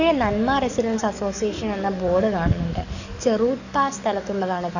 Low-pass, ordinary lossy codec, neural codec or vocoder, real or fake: 7.2 kHz; none; codec, 44.1 kHz, 7.8 kbps, DAC; fake